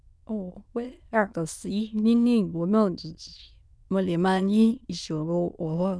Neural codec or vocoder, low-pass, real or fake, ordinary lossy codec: autoencoder, 22.05 kHz, a latent of 192 numbers a frame, VITS, trained on many speakers; none; fake; none